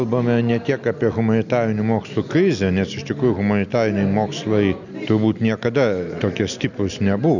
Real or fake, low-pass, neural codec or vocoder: real; 7.2 kHz; none